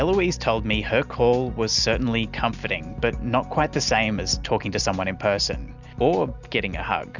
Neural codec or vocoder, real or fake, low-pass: none; real; 7.2 kHz